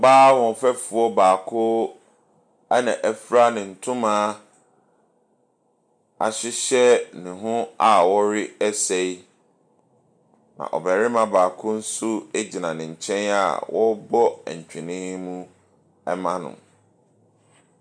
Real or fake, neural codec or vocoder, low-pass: real; none; 9.9 kHz